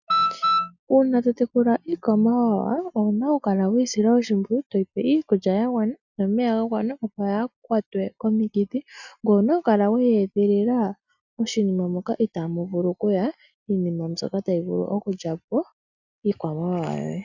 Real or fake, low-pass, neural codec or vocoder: real; 7.2 kHz; none